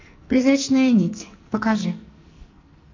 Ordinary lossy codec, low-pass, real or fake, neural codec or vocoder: MP3, 64 kbps; 7.2 kHz; fake; codec, 44.1 kHz, 2.6 kbps, SNAC